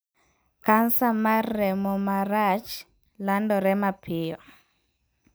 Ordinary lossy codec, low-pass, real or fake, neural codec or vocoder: none; none; real; none